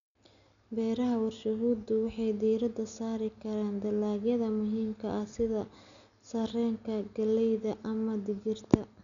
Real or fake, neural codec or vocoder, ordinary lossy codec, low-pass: real; none; none; 7.2 kHz